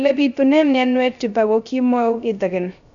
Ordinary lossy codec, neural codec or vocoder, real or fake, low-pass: none; codec, 16 kHz, 0.3 kbps, FocalCodec; fake; 7.2 kHz